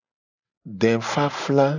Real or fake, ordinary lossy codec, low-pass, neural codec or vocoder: real; AAC, 48 kbps; 7.2 kHz; none